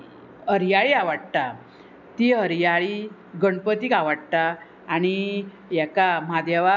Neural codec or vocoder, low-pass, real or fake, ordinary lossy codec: none; 7.2 kHz; real; none